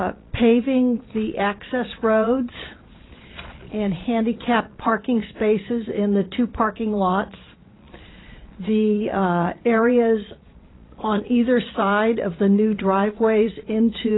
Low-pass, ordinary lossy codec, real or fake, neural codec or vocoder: 7.2 kHz; AAC, 16 kbps; fake; vocoder, 22.05 kHz, 80 mel bands, Vocos